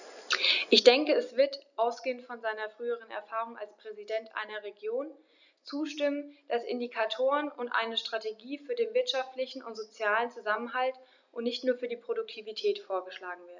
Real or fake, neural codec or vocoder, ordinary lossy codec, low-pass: real; none; none; 7.2 kHz